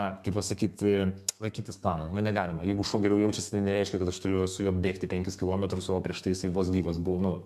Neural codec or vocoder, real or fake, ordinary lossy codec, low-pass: codec, 32 kHz, 1.9 kbps, SNAC; fake; MP3, 96 kbps; 14.4 kHz